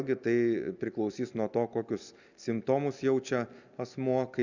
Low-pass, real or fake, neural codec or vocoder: 7.2 kHz; real; none